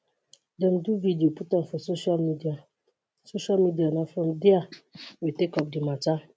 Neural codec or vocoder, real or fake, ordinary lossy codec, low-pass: none; real; none; none